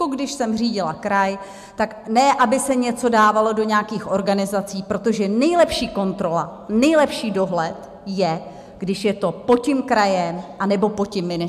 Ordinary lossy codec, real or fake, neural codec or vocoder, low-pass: MP3, 96 kbps; real; none; 14.4 kHz